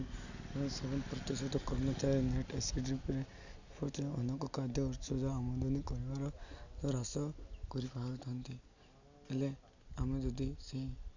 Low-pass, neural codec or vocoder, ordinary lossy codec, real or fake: 7.2 kHz; none; none; real